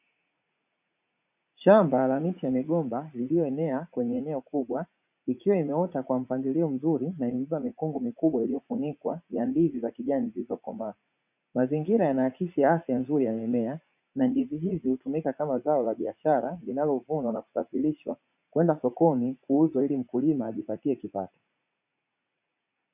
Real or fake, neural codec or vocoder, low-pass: fake; vocoder, 44.1 kHz, 80 mel bands, Vocos; 3.6 kHz